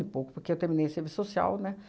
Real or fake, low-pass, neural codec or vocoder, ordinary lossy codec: real; none; none; none